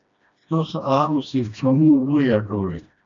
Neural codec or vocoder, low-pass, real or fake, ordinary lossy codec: codec, 16 kHz, 1 kbps, FreqCodec, smaller model; 7.2 kHz; fake; AAC, 64 kbps